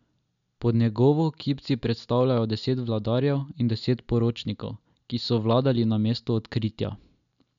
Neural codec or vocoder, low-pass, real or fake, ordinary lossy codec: none; 7.2 kHz; real; none